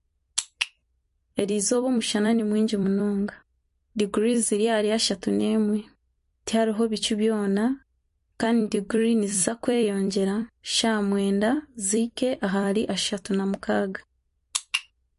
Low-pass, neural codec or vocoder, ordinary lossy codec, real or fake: 14.4 kHz; vocoder, 44.1 kHz, 128 mel bands every 256 samples, BigVGAN v2; MP3, 48 kbps; fake